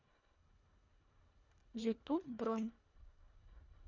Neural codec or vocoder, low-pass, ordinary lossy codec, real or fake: codec, 24 kHz, 1.5 kbps, HILCodec; 7.2 kHz; none; fake